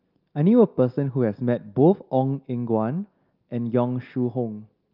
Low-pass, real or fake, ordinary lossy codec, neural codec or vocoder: 5.4 kHz; real; Opus, 24 kbps; none